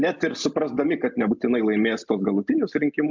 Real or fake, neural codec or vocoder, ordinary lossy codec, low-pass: real; none; MP3, 64 kbps; 7.2 kHz